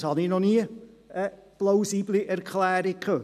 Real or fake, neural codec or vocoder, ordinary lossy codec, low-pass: real; none; none; 14.4 kHz